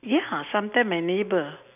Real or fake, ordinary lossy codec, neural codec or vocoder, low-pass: real; none; none; 3.6 kHz